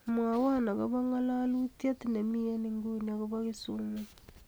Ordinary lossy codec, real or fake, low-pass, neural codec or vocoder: none; real; none; none